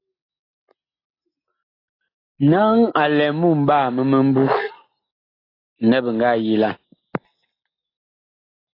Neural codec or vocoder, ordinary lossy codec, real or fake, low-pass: none; AAC, 24 kbps; real; 5.4 kHz